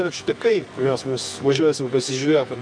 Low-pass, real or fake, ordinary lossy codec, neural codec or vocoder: 9.9 kHz; fake; MP3, 96 kbps; codec, 24 kHz, 0.9 kbps, WavTokenizer, medium music audio release